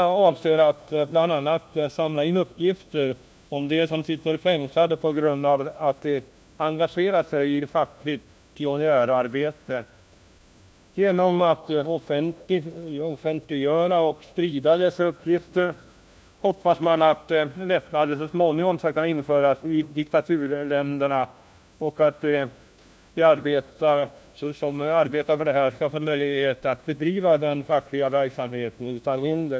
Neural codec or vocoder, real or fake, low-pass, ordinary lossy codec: codec, 16 kHz, 1 kbps, FunCodec, trained on LibriTTS, 50 frames a second; fake; none; none